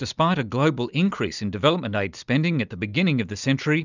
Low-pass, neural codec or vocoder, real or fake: 7.2 kHz; none; real